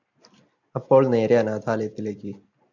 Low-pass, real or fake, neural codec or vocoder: 7.2 kHz; real; none